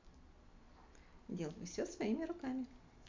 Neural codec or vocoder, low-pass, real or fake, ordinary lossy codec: none; 7.2 kHz; real; MP3, 64 kbps